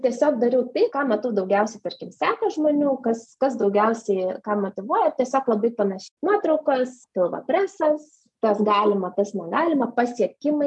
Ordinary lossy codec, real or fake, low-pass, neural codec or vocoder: MP3, 64 kbps; real; 10.8 kHz; none